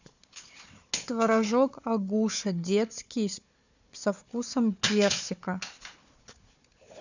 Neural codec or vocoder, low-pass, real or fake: codec, 16 kHz, 4 kbps, FunCodec, trained on Chinese and English, 50 frames a second; 7.2 kHz; fake